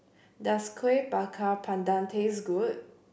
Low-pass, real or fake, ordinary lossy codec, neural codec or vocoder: none; real; none; none